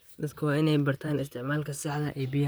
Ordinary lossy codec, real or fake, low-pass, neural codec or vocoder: none; fake; none; vocoder, 44.1 kHz, 128 mel bands, Pupu-Vocoder